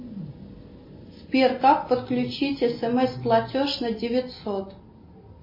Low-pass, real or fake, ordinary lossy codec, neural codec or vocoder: 5.4 kHz; real; MP3, 24 kbps; none